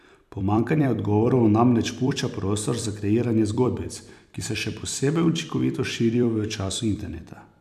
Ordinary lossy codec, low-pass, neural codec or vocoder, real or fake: none; 14.4 kHz; vocoder, 44.1 kHz, 128 mel bands every 256 samples, BigVGAN v2; fake